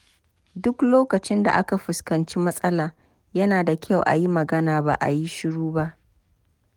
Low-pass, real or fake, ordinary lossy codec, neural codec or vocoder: 19.8 kHz; fake; Opus, 32 kbps; codec, 44.1 kHz, 7.8 kbps, DAC